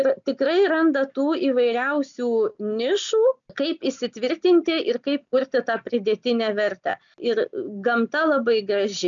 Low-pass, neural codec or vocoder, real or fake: 7.2 kHz; none; real